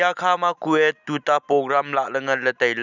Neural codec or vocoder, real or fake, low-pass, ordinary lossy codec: none; real; 7.2 kHz; none